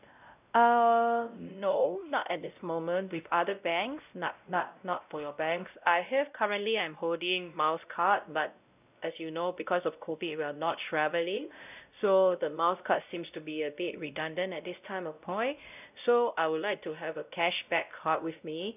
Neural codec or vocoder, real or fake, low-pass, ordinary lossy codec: codec, 16 kHz, 0.5 kbps, X-Codec, WavLM features, trained on Multilingual LibriSpeech; fake; 3.6 kHz; none